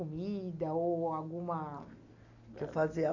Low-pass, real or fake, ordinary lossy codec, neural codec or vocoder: 7.2 kHz; real; none; none